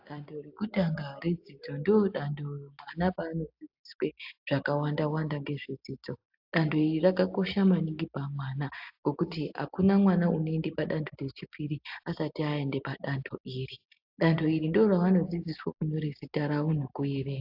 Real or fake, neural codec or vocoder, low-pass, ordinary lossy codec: real; none; 5.4 kHz; AAC, 48 kbps